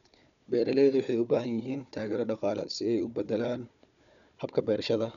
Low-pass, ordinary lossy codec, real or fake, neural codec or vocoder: 7.2 kHz; none; fake; codec, 16 kHz, 4 kbps, FunCodec, trained on Chinese and English, 50 frames a second